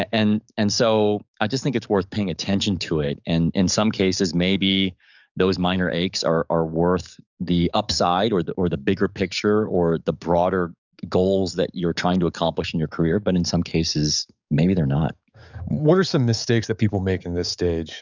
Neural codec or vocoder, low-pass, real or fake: codec, 44.1 kHz, 7.8 kbps, DAC; 7.2 kHz; fake